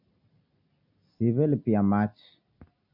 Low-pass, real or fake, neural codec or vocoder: 5.4 kHz; real; none